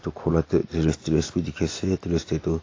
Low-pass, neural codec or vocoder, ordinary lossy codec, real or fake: 7.2 kHz; none; AAC, 32 kbps; real